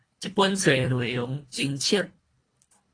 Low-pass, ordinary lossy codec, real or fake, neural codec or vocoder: 9.9 kHz; AAC, 32 kbps; fake; codec, 24 kHz, 1.5 kbps, HILCodec